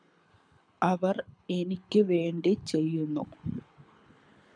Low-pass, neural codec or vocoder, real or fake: 9.9 kHz; codec, 24 kHz, 6 kbps, HILCodec; fake